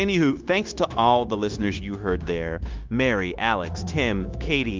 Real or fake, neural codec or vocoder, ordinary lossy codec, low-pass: fake; codec, 16 kHz, 0.9 kbps, LongCat-Audio-Codec; Opus, 32 kbps; 7.2 kHz